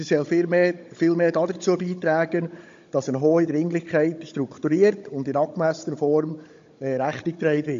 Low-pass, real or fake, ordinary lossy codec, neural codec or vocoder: 7.2 kHz; fake; MP3, 48 kbps; codec, 16 kHz, 16 kbps, FunCodec, trained on Chinese and English, 50 frames a second